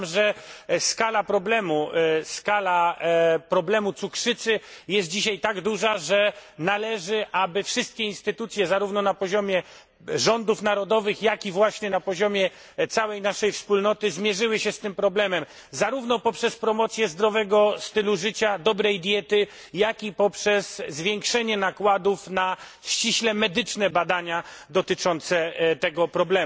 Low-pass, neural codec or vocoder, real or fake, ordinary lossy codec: none; none; real; none